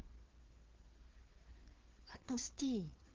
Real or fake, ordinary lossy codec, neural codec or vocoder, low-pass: fake; Opus, 16 kbps; codec, 16 kHz, 4 kbps, FreqCodec, larger model; 7.2 kHz